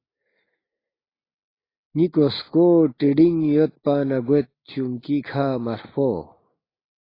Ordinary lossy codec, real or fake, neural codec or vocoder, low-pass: AAC, 24 kbps; real; none; 5.4 kHz